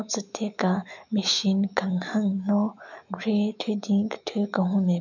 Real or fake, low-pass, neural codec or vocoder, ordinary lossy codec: fake; 7.2 kHz; autoencoder, 48 kHz, 128 numbers a frame, DAC-VAE, trained on Japanese speech; none